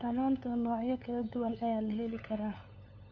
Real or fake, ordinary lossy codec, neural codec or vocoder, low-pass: fake; none; codec, 16 kHz, 8 kbps, FunCodec, trained on LibriTTS, 25 frames a second; 7.2 kHz